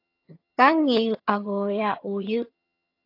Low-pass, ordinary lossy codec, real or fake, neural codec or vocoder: 5.4 kHz; AAC, 32 kbps; fake; vocoder, 22.05 kHz, 80 mel bands, HiFi-GAN